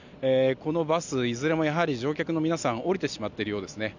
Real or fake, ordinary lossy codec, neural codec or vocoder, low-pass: real; none; none; 7.2 kHz